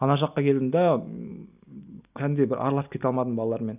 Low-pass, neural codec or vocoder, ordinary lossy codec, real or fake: 3.6 kHz; none; none; real